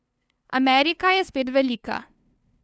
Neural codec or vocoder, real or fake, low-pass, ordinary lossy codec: codec, 16 kHz, 2 kbps, FunCodec, trained on LibriTTS, 25 frames a second; fake; none; none